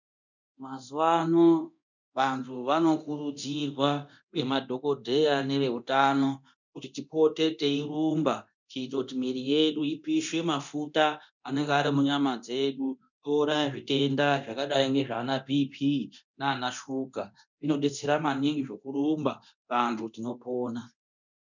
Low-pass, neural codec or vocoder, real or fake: 7.2 kHz; codec, 24 kHz, 0.9 kbps, DualCodec; fake